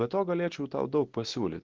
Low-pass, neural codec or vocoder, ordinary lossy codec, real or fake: 7.2 kHz; none; Opus, 16 kbps; real